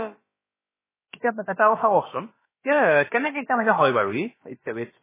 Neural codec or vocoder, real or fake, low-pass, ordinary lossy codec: codec, 16 kHz, about 1 kbps, DyCAST, with the encoder's durations; fake; 3.6 kHz; MP3, 16 kbps